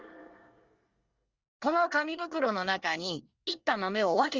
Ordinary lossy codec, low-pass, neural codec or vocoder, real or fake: Opus, 32 kbps; 7.2 kHz; codec, 24 kHz, 1 kbps, SNAC; fake